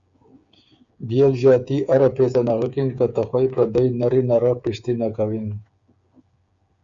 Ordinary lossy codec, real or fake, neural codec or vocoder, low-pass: Opus, 64 kbps; fake; codec, 16 kHz, 8 kbps, FreqCodec, smaller model; 7.2 kHz